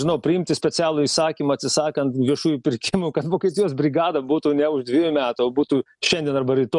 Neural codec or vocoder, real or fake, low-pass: none; real; 10.8 kHz